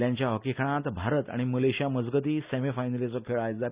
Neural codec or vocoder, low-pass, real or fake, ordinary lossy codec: none; 3.6 kHz; real; Opus, 64 kbps